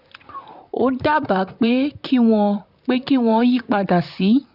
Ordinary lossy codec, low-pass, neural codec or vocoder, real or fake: none; 5.4 kHz; vocoder, 44.1 kHz, 128 mel bands, Pupu-Vocoder; fake